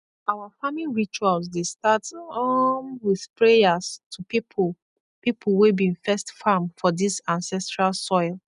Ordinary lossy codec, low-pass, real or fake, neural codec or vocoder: none; 10.8 kHz; real; none